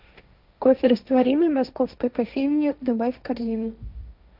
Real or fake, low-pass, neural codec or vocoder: fake; 5.4 kHz; codec, 16 kHz, 1.1 kbps, Voila-Tokenizer